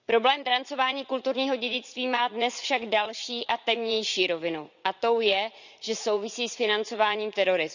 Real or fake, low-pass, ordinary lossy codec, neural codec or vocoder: fake; 7.2 kHz; none; vocoder, 44.1 kHz, 80 mel bands, Vocos